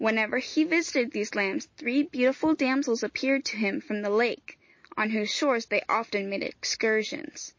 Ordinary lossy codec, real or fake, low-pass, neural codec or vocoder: MP3, 32 kbps; real; 7.2 kHz; none